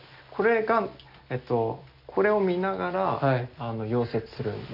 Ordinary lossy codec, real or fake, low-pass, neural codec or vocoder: none; real; 5.4 kHz; none